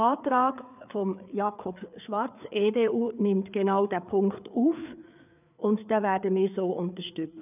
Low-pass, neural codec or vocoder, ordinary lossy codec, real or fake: 3.6 kHz; codec, 16 kHz, 4 kbps, FreqCodec, larger model; none; fake